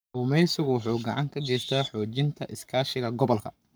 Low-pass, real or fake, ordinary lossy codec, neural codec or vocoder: none; fake; none; codec, 44.1 kHz, 7.8 kbps, Pupu-Codec